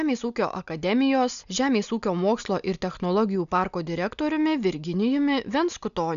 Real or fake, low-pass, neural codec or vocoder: real; 7.2 kHz; none